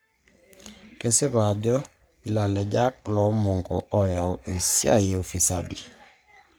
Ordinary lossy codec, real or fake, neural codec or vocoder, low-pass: none; fake; codec, 44.1 kHz, 3.4 kbps, Pupu-Codec; none